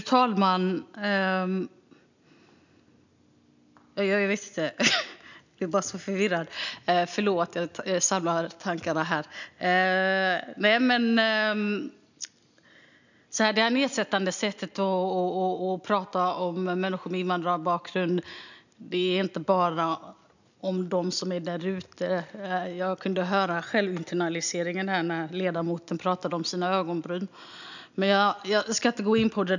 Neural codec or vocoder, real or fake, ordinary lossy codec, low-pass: none; real; none; 7.2 kHz